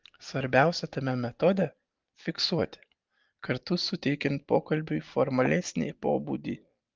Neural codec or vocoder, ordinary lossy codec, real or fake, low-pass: none; Opus, 32 kbps; real; 7.2 kHz